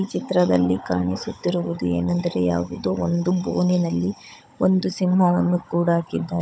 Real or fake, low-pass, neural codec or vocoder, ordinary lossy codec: fake; none; codec, 16 kHz, 16 kbps, FunCodec, trained on Chinese and English, 50 frames a second; none